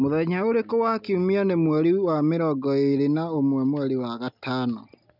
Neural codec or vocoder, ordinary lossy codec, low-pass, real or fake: none; none; 5.4 kHz; real